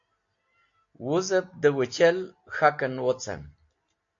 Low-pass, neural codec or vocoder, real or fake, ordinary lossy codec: 7.2 kHz; none; real; AAC, 48 kbps